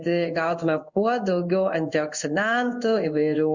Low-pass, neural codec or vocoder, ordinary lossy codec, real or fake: 7.2 kHz; codec, 16 kHz in and 24 kHz out, 1 kbps, XY-Tokenizer; Opus, 64 kbps; fake